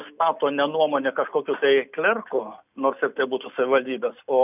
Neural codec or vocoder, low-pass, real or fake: none; 3.6 kHz; real